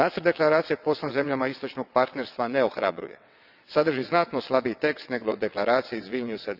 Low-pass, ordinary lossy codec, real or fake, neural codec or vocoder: 5.4 kHz; none; fake; vocoder, 22.05 kHz, 80 mel bands, WaveNeXt